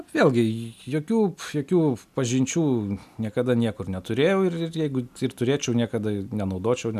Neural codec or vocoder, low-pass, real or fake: none; 14.4 kHz; real